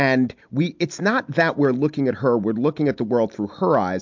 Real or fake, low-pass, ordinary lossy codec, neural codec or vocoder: real; 7.2 kHz; MP3, 64 kbps; none